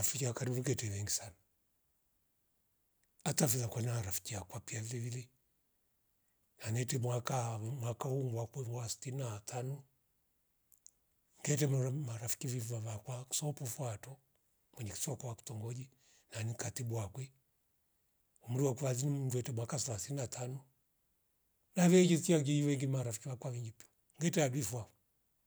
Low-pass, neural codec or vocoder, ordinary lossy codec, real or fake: none; none; none; real